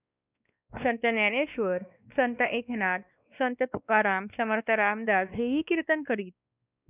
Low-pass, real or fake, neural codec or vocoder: 3.6 kHz; fake; codec, 16 kHz, 2 kbps, X-Codec, WavLM features, trained on Multilingual LibriSpeech